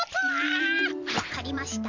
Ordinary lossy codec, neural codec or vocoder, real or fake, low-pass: MP3, 64 kbps; vocoder, 44.1 kHz, 128 mel bands every 512 samples, BigVGAN v2; fake; 7.2 kHz